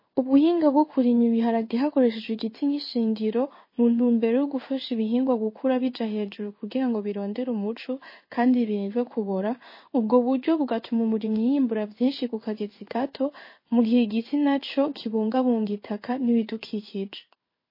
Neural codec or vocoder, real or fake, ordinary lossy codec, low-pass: codec, 16 kHz in and 24 kHz out, 1 kbps, XY-Tokenizer; fake; MP3, 24 kbps; 5.4 kHz